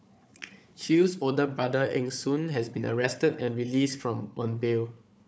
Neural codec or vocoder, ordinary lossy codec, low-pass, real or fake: codec, 16 kHz, 4 kbps, FunCodec, trained on Chinese and English, 50 frames a second; none; none; fake